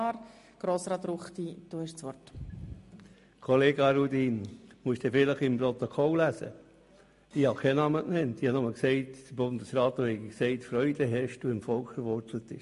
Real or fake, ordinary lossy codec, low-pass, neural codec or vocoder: real; MP3, 48 kbps; 14.4 kHz; none